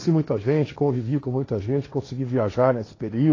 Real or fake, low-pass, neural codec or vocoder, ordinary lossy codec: fake; 7.2 kHz; codec, 16 kHz, 1.1 kbps, Voila-Tokenizer; AAC, 32 kbps